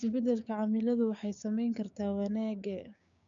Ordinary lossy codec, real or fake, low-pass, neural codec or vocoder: none; fake; 7.2 kHz; codec, 16 kHz, 6 kbps, DAC